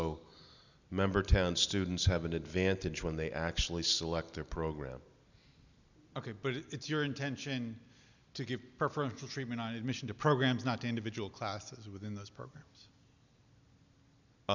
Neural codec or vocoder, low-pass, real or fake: none; 7.2 kHz; real